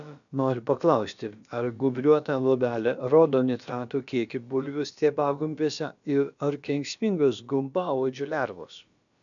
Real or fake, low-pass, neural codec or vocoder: fake; 7.2 kHz; codec, 16 kHz, about 1 kbps, DyCAST, with the encoder's durations